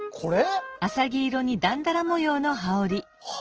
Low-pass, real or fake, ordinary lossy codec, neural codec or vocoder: 7.2 kHz; real; Opus, 16 kbps; none